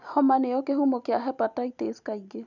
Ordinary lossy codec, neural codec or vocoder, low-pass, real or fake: none; none; 7.2 kHz; real